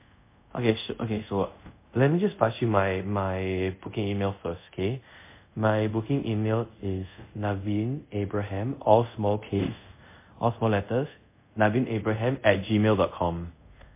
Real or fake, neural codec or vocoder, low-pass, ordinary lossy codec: fake; codec, 24 kHz, 0.5 kbps, DualCodec; 3.6 kHz; MP3, 24 kbps